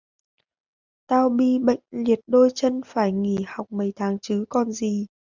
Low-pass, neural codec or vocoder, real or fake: 7.2 kHz; none; real